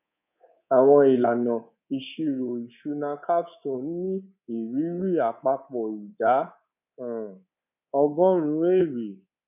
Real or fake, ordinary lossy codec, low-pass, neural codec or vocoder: fake; none; 3.6 kHz; codec, 24 kHz, 3.1 kbps, DualCodec